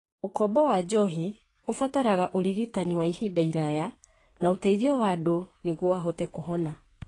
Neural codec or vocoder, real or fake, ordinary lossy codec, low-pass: codec, 32 kHz, 1.9 kbps, SNAC; fake; AAC, 32 kbps; 10.8 kHz